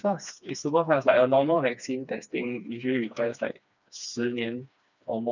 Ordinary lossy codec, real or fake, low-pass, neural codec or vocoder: none; fake; 7.2 kHz; codec, 16 kHz, 2 kbps, FreqCodec, smaller model